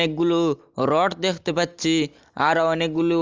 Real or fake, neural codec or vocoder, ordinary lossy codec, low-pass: real; none; Opus, 32 kbps; 7.2 kHz